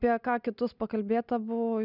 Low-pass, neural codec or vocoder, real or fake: 5.4 kHz; none; real